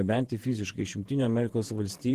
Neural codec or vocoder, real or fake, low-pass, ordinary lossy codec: vocoder, 48 kHz, 128 mel bands, Vocos; fake; 14.4 kHz; Opus, 16 kbps